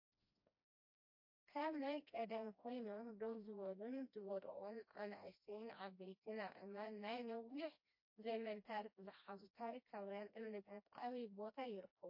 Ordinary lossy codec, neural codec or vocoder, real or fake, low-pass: MP3, 32 kbps; codec, 16 kHz, 1 kbps, FreqCodec, smaller model; fake; 5.4 kHz